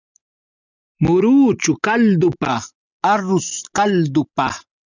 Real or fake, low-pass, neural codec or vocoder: real; 7.2 kHz; none